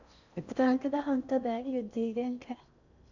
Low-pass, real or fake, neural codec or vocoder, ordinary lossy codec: 7.2 kHz; fake; codec, 16 kHz in and 24 kHz out, 0.6 kbps, FocalCodec, streaming, 4096 codes; none